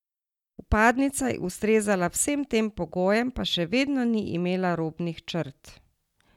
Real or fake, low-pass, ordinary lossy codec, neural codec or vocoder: real; 19.8 kHz; none; none